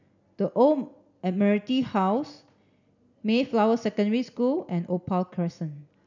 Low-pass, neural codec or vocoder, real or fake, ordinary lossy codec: 7.2 kHz; none; real; none